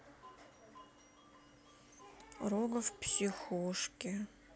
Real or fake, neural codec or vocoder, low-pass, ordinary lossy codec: real; none; none; none